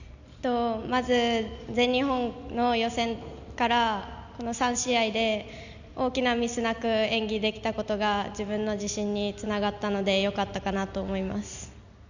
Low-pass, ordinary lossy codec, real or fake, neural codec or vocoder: 7.2 kHz; none; real; none